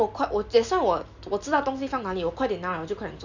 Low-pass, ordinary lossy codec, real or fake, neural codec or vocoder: 7.2 kHz; none; real; none